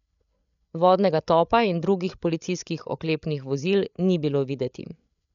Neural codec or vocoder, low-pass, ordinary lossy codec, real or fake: codec, 16 kHz, 8 kbps, FreqCodec, larger model; 7.2 kHz; none; fake